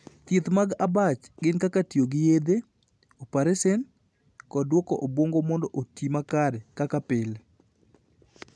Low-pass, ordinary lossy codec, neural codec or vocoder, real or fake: none; none; none; real